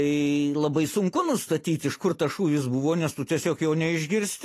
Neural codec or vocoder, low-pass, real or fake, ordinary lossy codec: vocoder, 44.1 kHz, 128 mel bands every 256 samples, BigVGAN v2; 14.4 kHz; fake; AAC, 48 kbps